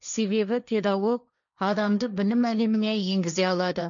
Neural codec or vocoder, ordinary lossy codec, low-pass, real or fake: codec, 16 kHz, 1.1 kbps, Voila-Tokenizer; none; 7.2 kHz; fake